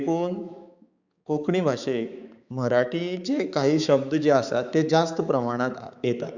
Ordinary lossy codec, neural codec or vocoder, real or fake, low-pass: Opus, 64 kbps; codec, 16 kHz, 4 kbps, X-Codec, HuBERT features, trained on balanced general audio; fake; 7.2 kHz